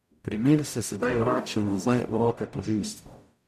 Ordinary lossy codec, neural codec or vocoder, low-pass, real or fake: none; codec, 44.1 kHz, 0.9 kbps, DAC; 14.4 kHz; fake